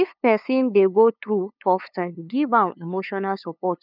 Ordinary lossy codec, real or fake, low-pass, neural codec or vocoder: none; fake; 5.4 kHz; codec, 16 kHz, 2 kbps, FunCodec, trained on LibriTTS, 25 frames a second